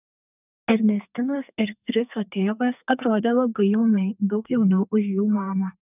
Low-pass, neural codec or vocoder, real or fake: 3.6 kHz; codec, 32 kHz, 1.9 kbps, SNAC; fake